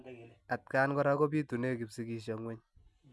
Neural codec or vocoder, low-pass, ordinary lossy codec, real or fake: none; none; none; real